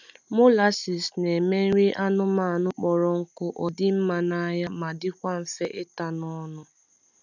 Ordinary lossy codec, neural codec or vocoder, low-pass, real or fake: none; none; 7.2 kHz; real